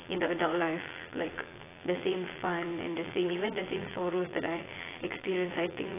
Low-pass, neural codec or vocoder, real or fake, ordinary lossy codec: 3.6 kHz; vocoder, 22.05 kHz, 80 mel bands, Vocos; fake; AAC, 16 kbps